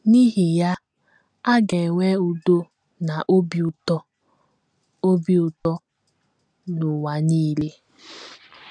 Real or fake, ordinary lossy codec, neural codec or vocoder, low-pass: real; none; none; 9.9 kHz